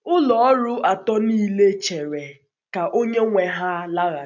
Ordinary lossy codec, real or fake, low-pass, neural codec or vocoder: none; real; 7.2 kHz; none